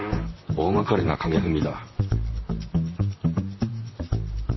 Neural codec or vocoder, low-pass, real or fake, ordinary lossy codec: vocoder, 44.1 kHz, 128 mel bands, Pupu-Vocoder; 7.2 kHz; fake; MP3, 24 kbps